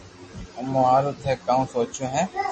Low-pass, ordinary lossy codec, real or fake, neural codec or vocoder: 10.8 kHz; MP3, 32 kbps; real; none